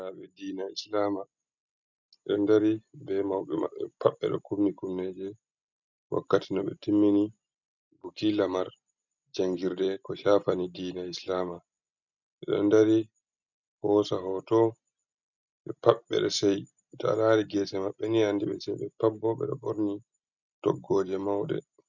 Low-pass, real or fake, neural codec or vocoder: 7.2 kHz; real; none